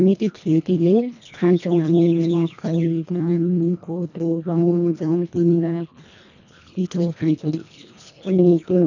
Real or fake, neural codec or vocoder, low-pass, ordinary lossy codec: fake; codec, 24 kHz, 1.5 kbps, HILCodec; 7.2 kHz; none